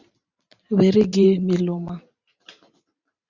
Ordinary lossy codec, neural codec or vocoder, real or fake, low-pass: Opus, 64 kbps; vocoder, 44.1 kHz, 128 mel bands every 512 samples, BigVGAN v2; fake; 7.2 kHz